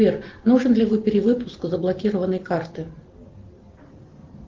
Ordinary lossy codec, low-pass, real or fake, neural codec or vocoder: Opus, 16 kbps; 7.2 kHz; real; none